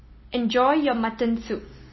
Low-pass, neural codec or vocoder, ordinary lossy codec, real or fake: 7.2 kHz; none; MP3, 24 kbps; real